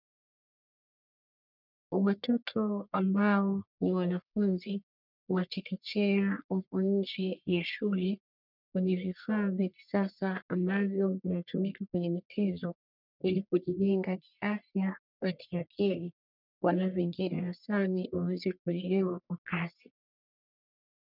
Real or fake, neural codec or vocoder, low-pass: fake; codec, 44.1 kHz, 1.7 kbps, Pupu-Codec; 5.4 kHz